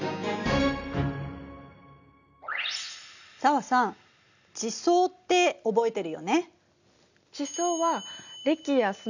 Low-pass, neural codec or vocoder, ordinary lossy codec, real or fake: 7.2 kHz; none; none; real